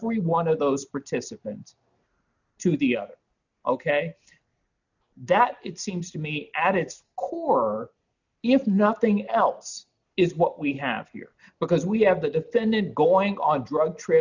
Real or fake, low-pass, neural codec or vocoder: real; 7.2 kHz; none